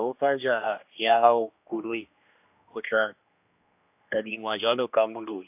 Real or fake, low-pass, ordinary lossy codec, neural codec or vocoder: fake; 3.6 kHz; AAC, 32 kbps; codec, 16 kHz, 1 kbps, X-Codec, HuBERT features, trained on general audio